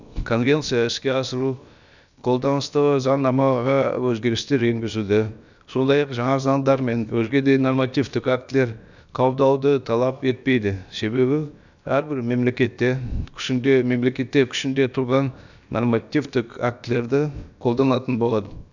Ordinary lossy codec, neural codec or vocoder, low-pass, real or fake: none; codec, 16 kHz, about 1 kbps, DyCAST, with the encoder's durations; 7.2 kHz; fake